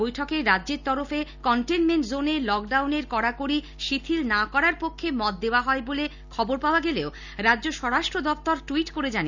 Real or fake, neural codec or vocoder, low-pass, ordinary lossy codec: real; none; 7.2 kHz; none